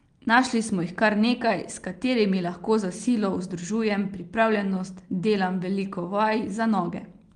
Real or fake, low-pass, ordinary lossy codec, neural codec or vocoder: real; 9.9 kHz; Opus, 24 kbps; none